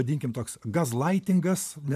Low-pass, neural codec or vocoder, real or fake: 14.4 kHz; vocoder, 44.1 kHz, 128 mel bands every 512 samples, BigVGAN v2; fake